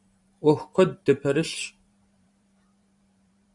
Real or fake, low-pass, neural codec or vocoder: fake; 10.8 kHz; vocoder, 44.1 kHz, 128 mel bands every 512 samples, BigVGAN v2